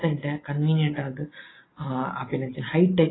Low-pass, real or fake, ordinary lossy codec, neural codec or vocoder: 7.2 kHz; real; AAC, 16 kbps; none